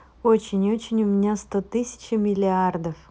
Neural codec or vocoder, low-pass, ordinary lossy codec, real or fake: none; none; none; real